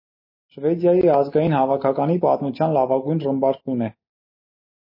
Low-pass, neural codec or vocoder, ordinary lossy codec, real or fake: 5.4 kHz; none; MP3, 24 kbps; real